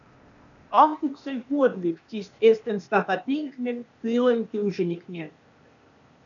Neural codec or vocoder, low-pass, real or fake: codec, 16 kHz, 0.8 kbps, ZipCodec; 7.2 kHz; fake